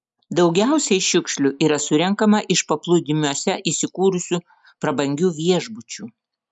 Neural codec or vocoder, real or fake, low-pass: none; real; 10.8 kHz